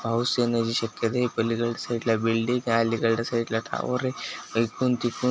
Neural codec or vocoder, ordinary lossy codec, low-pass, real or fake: none; none; none; real